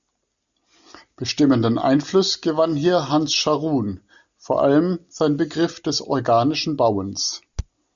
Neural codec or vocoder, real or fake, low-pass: none; real; 7.2 kHz